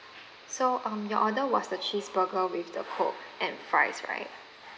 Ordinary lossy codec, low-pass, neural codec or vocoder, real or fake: none; none; none; real